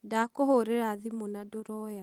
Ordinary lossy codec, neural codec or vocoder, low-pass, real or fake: Opus, 24 kbps; vocoder, 44.1 kHz, 128 mel bands every 512 samples, BigVGAN v2; 19.8 kHz; fake